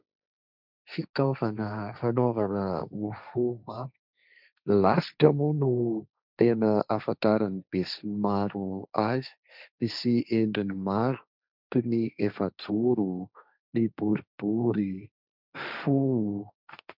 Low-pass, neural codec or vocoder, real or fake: 5.4 kHz; codec, 16 kHz, 1.1 kbps, Voila-Tokenizer; fake